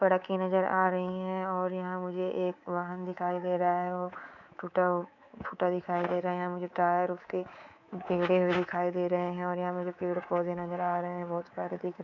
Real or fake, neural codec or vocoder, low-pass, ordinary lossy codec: fake; codec, 24 kHz, 3.1 kbps, DualCodec; 7.2 kHz; none